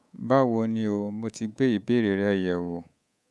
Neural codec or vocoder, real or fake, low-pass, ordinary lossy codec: codec, 24 kHz, 3.1 kbps, DualCodec; fake; none; none